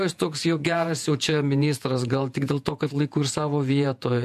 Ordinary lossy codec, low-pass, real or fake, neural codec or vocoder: MP3, 64 kbps; 14.4 kHz; fake; vocoder, 48 kHz, 128 mel bands, Vocos